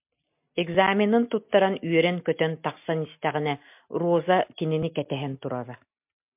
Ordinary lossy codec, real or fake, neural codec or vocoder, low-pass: MP3, 32 kbps; real; none; 3.6 kHz